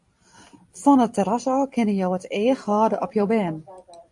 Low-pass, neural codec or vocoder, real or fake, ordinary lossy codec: 10.8 kHz; vocoder, 44.1 kHz, 128 mel bands every 256 samples, BigVGAN v2; fake; AAC, 64 kbps